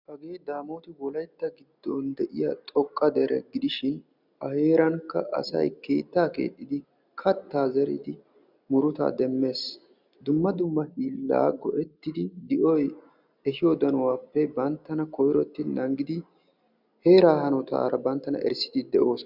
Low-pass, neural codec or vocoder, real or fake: 5.4 kHz; none; real